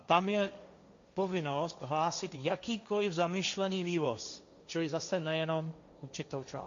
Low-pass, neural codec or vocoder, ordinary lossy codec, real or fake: 7.2 kHz; codec, 16 kHz, 1.1 kbps, Voila-Tokenizer; MP3, 64 kbps; fake